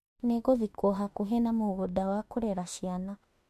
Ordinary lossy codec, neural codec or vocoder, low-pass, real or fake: MP3, 64 kbps; autoencoder, 48 kHz, 32 numbers a frame, DAC-VAE, trained on Japanese speech; 14.4 kHz; fake